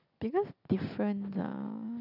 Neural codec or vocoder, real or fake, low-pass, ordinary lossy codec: none; real; 5.4 kHz; none